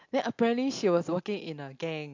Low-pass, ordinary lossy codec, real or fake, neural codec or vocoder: 7.2 kHz; AAC, 48 kbps; real; none